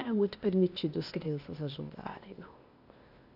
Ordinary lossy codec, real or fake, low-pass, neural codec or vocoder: none; fake; 5.4 kHz; codec, 16 kHz, 0.8 kbps, ZipCodec